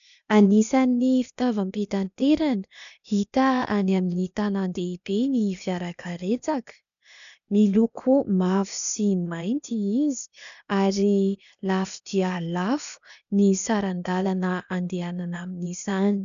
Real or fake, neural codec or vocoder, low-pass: fake; codec, 16 kHz, 0.8 kbps, ZipCodec; 7.2 kHz